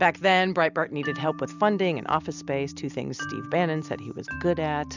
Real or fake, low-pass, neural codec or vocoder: real; 7.2 kHz; none